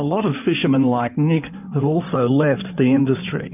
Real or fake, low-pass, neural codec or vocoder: fake; 3.6 kHz; codec, 16 kHz in and 24 kHz out, 2.2 kbps, FireRedTTS-2 codec